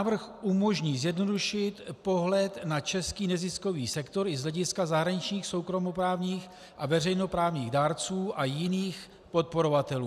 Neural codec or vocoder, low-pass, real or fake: none; 14.4 kHz; real